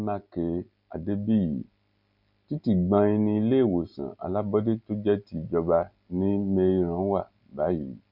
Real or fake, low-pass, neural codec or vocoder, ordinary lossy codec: real; 5.4 kHz; none; none